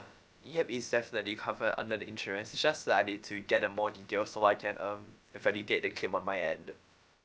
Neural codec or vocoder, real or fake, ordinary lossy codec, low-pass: codec, 16 kHz, about 1 kbps, DyCAST, with the encoder's durations; fake; none; none